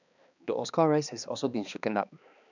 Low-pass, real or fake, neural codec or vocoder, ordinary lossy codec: 7.2 kHz; fake; codec, 16 kHz, 2 kbps, X-Codec, HuBERT features, trained on balanced general audio; none